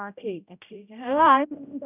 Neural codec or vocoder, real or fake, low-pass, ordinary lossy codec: codec, 16 kHz, 0.5 kbps, X-Codec, HuBERT features, trained on general audio; fake; 3.6 kHz; none